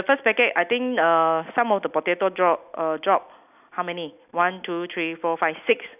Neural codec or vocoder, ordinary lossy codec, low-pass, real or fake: none; none; 3.6 kHz; real